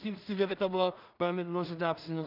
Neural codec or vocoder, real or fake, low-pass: codec, 16 kHz in and 24 kHz out, 0.4 kbps, LongCat-Audio-Codec, two codebook decoder; fake; 5.4 kHz